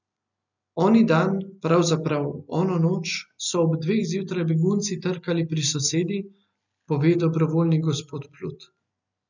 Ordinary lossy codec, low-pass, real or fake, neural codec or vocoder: none; 7.2 kHz; real; none